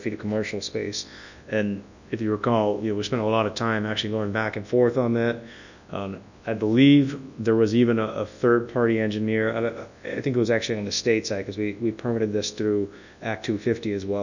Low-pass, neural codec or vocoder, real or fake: 7.2 kHz; codec, 24 kHz, 0.9 kbps, WavTokenizer, large speech release; fake